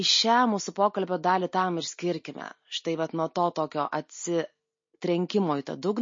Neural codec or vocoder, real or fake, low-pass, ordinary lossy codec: none; real; 7.2 kHz; MP3, 32 kbps